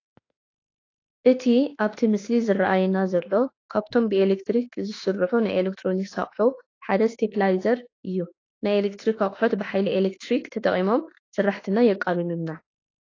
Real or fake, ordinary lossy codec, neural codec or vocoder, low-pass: fake; AAC, 32 kbps; autoencoder, 48 kHz, 32 numbers a frame, DAC-VAE, trained on Japanese speech; 7.2 kHz